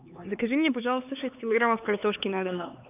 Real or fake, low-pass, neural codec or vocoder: fake; 3.6 kHz; codec, 16 kHz, 4 kbps, X-Codec, HuBERT features, trained on LibriSpeech